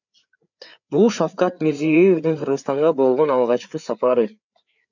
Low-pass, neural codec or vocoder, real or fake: 7.2 kHz; codec, 16 kHz, 4 kbps, FreqCodec, larger model; fake